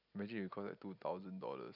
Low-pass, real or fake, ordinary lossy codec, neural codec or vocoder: 5.4 kHz; real; none; none